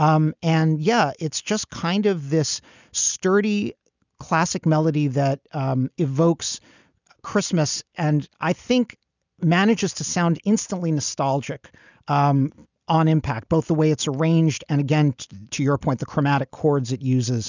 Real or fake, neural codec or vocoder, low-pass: real; none; 7.2 kHz